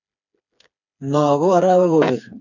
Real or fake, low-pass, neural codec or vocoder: fake; 7.2 kHz; codec, 16 kHz, 4 kbps, FreqCodec, smaller model